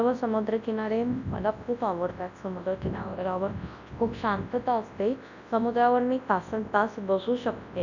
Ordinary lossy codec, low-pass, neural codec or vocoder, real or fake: none; 7.2 kHz; codec, 24 kHz, 0.9 kbps, WavTokenizer, large speech release; fake